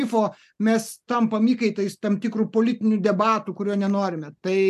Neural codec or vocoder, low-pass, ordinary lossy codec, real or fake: none; 14.4 kHz; MP3, 96 kbps; real